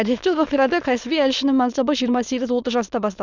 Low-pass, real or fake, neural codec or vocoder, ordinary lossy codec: 7.2 kHz; fake; autoencoder, 22.05 kHz, a latent of 192 numbers a frame, VITS, trained on many speakers; none